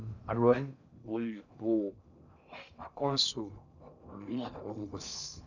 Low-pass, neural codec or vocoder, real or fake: 7.2 kHz; codec, 16 kHz in and 24 kHz out, 0.6 kbps, FocalCodec, streaming, 4096 codes; fake